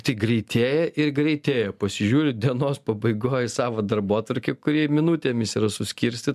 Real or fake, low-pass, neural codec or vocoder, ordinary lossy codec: real; 14.4 kHz; none; MP3, 96 kbps